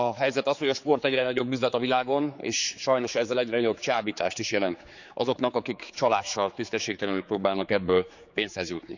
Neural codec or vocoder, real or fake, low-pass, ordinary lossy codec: codec, 16 kHz, 4 kbps, X-Codec, HuBERT features, trained on general audio; fake; 7.2 kHz; none